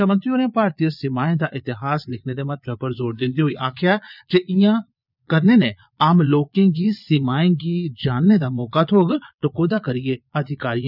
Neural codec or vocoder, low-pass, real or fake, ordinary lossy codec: vocoder, 22.05 kHz, 80 mel bands, Vocos; 5.4 kHz; fake; none